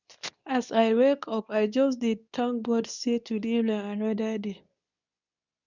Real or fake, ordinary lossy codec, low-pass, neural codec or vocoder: fake; none; 7.2 kHz; codec, 24 kHz, 0.9 kbps, WavTokenizer, medium speech release version 2